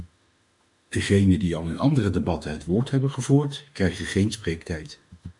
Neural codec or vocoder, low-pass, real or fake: autoencoder, 48 kHz, 32 numbers a frame, DAC-VAE, trained on Japanese speech; 10.8 kHz; fake